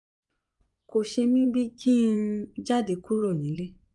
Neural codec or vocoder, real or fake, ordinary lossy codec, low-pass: vocoder, 24 kHz, 100 mel bands, Vocos; fake; none; 10.8 kHz